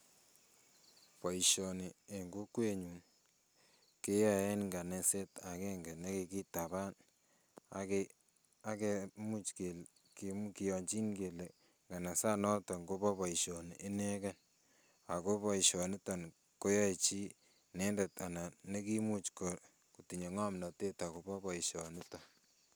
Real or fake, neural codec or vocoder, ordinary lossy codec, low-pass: real; none; none; none